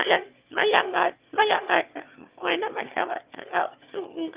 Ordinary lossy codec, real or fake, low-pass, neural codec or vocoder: Opus, 24 kbps; fake; 3.6 kHz; autoencoder, 22.05 kHz, a latent of 192 numbers a frame, VITS, trained on one speaker